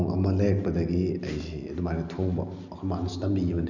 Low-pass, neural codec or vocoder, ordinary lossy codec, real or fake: 7.2 kHz; none; none; real